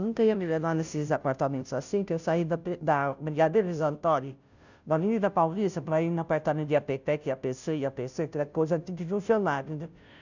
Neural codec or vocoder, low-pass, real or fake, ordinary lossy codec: codec, 16 kHz, 0.5 kbps, FunCodec, trained on Chinese and English, 25 frames a second; 7.2 kHz; fake; none